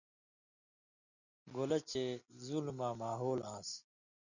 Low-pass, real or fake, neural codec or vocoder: 7.2 kHz; real; none